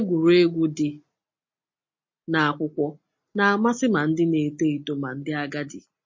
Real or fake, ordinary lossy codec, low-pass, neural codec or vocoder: real; MP3, 32 kbps; 7.2 kHz; none